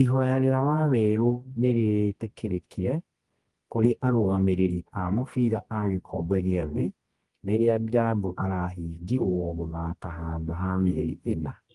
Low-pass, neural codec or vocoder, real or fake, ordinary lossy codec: 10.8 kHz; codec, 24 kHz, 0.9 kbps, WavTokenizer, medium music audio release; fake; Opus, 24 kbps